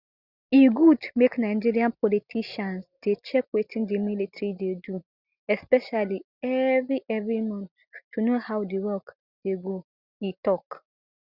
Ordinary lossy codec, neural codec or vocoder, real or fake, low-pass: Opus, 64 kbps; none; real; 5.4 kHz